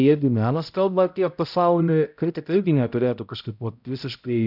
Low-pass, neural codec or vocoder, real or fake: 5.4 kHz; codec, 16 kHz, 0.5 kbps, X-Codec, HuBERT features, trained on balanced general audio; fake